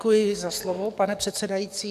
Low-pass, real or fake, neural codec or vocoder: 14.4 kHz; fake; codec, 44.1 kHz, 7.8 kbps, DAC